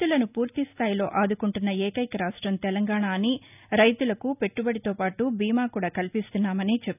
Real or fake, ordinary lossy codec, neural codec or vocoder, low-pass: real; none; none; 3.6 kHz